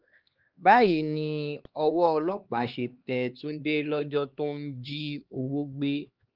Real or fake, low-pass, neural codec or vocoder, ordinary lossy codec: fake; 5.4 kHz; codec, 16 kHz, 2 kbps, X-Codec, HuBERT features, trained on LibriSpeech; Opus, 24 kbps